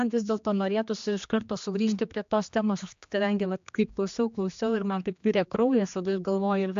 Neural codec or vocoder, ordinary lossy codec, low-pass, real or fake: codec, 16 kHz, 2 kbps, X-Codec, HuBERT features, trained on general audio; AAC, 64 kbps; 7.2 kHz; fake